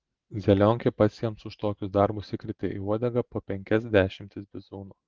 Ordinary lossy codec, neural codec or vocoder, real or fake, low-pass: Opus, 16 kbps; none; real; 7.2 kHz